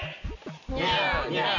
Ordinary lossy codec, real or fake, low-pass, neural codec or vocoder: none; real; 7.2 kHz; none